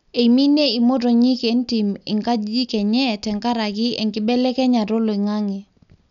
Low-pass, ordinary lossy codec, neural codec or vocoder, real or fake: 7.2 kHz; none; none; real